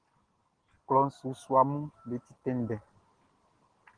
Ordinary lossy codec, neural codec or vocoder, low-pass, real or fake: Opus, 16 kbps; none; 9.9 kHz; real